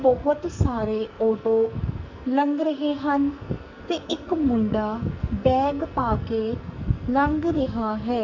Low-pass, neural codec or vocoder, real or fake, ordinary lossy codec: 7.2 kHz; codec, 44.1 kHz, 2.6 kbps, SNAC; fake; Opus, 64 kbps